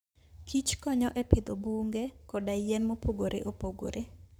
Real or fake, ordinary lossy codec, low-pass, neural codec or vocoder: fake; none; none; codec, 44.1 kHz, 7.8 kbps, Pupu-Codec